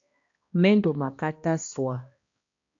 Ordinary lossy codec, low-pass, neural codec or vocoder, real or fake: AAC, 48 kbps; 7.2 kHz; codec, 16 kHz, 1 kbps, X-Codec, HuBERT features, trained on balanced general audio; fake